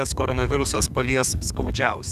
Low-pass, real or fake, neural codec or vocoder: 14.4 kHz; fake; codec, 32 kHz, 1.9 kbps, SNAC